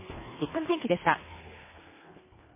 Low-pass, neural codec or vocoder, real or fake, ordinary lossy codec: 3.6 kHz; codec, 24 kHz, 1.5 kbps, HILCodec; fake; MP3, 16 kbps